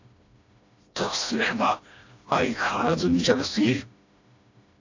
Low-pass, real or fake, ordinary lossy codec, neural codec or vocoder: 7.2 kHz; fake; AAC, 48 kbps; codec, 16 kHz, 1 kbps, FreqCodec, smaller model